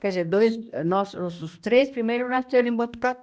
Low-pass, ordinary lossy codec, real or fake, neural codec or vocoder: none; none; fake; codec, 16 kHz, 1 kbps, X-Codec, HuBERT features, trained on balanced general audio